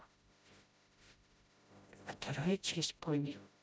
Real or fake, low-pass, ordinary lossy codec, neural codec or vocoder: fake; none; none; codec, 16 kHz, 0.5 kbps, FreqCodec, smaller model